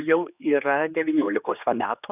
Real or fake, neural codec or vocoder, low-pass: fake; codec, 16 kHz, 2 kbps, X-Codec, HuBERT features, trained on general audio; 3.6 kHz